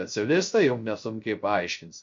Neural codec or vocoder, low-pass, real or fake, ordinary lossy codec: codec, 16 kHz, 0.3 kbps, FocalCodec; 7.2 kHz; fake; MP3, 48 kbps